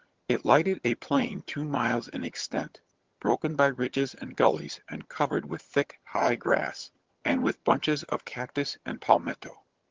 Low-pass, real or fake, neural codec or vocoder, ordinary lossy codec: 7.2 kHz; fake; vocoder, 22.05 kHz, 80 mel bands, HiFi-GAN; Opus, 16 kbps